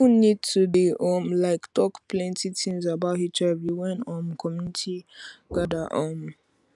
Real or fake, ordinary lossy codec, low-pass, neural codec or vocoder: real; none; 10.8 kHz; none